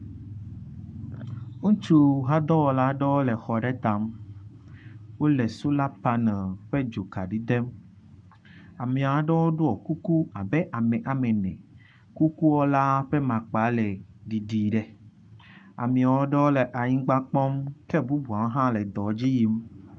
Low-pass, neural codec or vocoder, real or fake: 9.9 kHz; codec, 44.1 kHz, 7.8 kbps, Pupu-Codec; fake